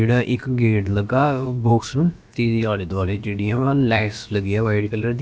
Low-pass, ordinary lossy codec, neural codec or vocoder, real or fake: none; none; codec, 16 kHz, about 1 kbps, DyCAST, with the encoder's durations; fake